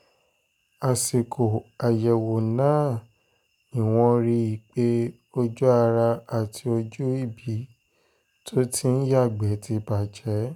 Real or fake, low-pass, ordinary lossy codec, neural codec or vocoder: real; 19.8 kHz; none; none